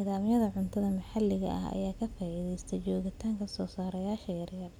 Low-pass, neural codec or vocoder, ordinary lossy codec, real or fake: 19.8 kHz; none; none; real